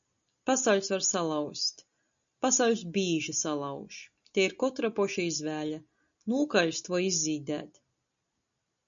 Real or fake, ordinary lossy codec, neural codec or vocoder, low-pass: real; MP3, 96 kbps; none; 7.2 kHz